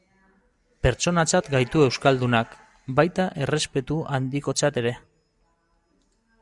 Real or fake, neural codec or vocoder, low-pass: real; none; 10.8 kHz